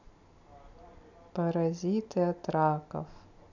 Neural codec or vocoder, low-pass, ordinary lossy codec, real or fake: none; 7.2 kHz; none; real